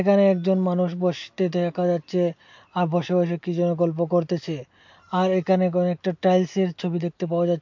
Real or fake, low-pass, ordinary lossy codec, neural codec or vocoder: real; 7.2 kHz; MP3, 48 kbps; none